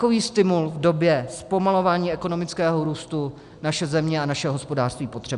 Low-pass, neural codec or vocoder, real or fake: 10.8 kHz; none; real